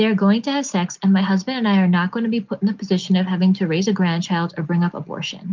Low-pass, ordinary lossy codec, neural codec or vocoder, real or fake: 7.2 kHz; Opus, 24 kbps; none; real